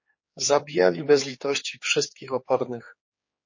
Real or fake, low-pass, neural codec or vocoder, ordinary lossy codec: fake; 7.2 kHz; codec, 16 kHz, 4 kbps, X-Codec, HuBERT features, trained on general audio; MP3, 32 kbps